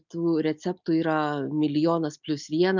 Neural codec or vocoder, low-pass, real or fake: none; 7.2 kHz; real